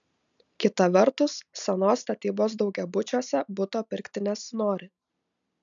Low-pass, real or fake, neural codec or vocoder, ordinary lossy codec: 7.2 kHz; real; none; MP3, 96 kbps